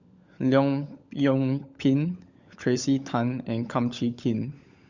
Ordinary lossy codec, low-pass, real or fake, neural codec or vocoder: Opus, 64 kbps; 7.2 kHz; fake; codec, 16 kHz, 16 kbps, FunCodec, trained on LibriTTS, 50 frames a second